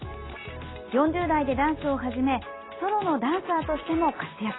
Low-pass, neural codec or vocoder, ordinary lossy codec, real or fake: 7.2 kHz; none; AAC, 16 kbps; real